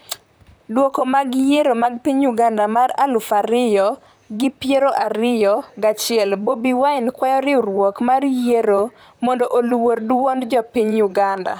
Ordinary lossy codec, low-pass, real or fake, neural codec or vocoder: none; none; fake; vocoder, 44.1 kHz, 128 mel bands, Pupu-Vocoder